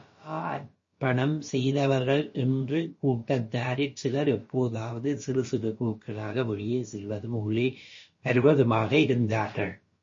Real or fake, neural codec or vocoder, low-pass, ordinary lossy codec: fake; codec, 16 kHz, about 1 kbps, DyCAST, with the encoder's durations; 7.2 kHz; MP3, 32 kbps